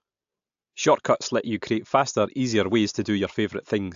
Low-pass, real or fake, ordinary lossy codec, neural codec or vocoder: 7.2 kHz; real; AAC, 64 kbps; none